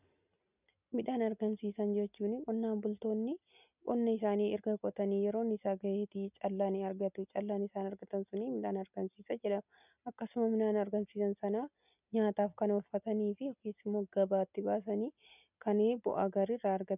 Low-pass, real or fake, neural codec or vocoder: 3.6 kHz; real; none